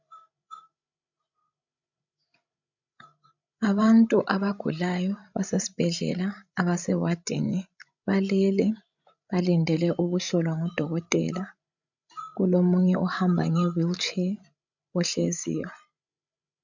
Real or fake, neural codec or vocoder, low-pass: fake; codec, 16 kHz, 16 kbps, FreqCodec, larger model; 7.2 kHz